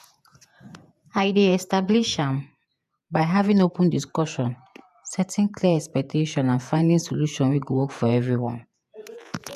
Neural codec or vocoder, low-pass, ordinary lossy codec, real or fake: vocoder, 48 kHz, 128 mel bands, Vocos; 14.4 kHz; none; fake